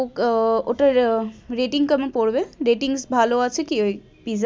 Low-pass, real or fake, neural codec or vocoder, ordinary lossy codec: none; real; none; none